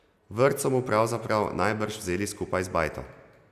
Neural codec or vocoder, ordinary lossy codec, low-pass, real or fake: none; none; 14.4 kHz; real